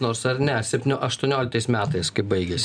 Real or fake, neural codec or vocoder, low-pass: real; none; 9.9 kHz